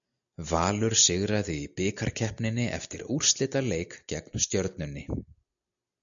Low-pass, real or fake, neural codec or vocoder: 7.2 kHz; real; none